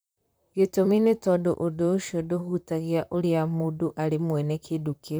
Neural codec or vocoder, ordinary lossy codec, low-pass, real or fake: vocoder, 44.1 kHz, 128 mel bands, Pupu-Vocoder; none; none; fake